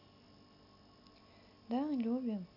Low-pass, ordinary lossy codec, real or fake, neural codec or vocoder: 5.4 kHz; none; real; none